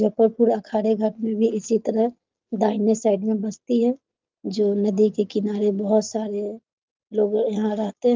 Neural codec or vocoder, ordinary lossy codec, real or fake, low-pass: none; Opus, 32 kbps; real; 7.2 kHz